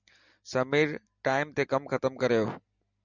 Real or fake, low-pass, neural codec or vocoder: real; 7.2 kHz; none